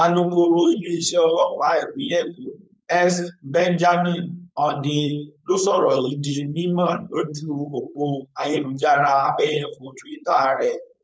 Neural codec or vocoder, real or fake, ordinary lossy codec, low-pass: codec, 16 kHz, 4.8 kbps, FACodec; fake; none; none